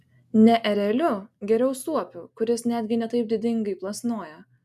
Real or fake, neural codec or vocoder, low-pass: real; none; 14.4 kHz